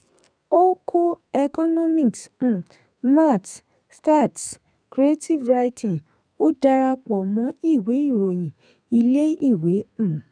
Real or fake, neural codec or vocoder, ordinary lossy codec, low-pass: fake; codec, 32 kHz, 1.9 kbps, SNAC; none; 9.9 kHz